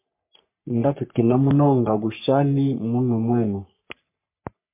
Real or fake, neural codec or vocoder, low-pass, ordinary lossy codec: fake; codec, 44.1 kHz, 3.4 kbps, Pupu-Codec; 3.6 kHz; MP3, 24 kbps